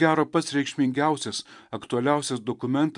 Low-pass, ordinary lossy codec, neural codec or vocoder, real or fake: 10.8 kHz; MP3, 96 kbps; vocoder, 44.1 kHz, 128 mel bands every 256 samples, BigVGAN v2; fake